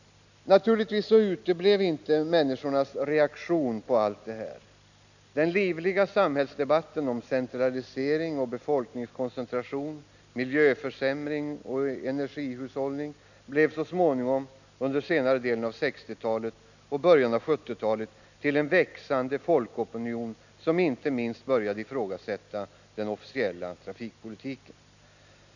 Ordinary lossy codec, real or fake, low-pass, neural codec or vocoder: none; real; 7.2 kHz; none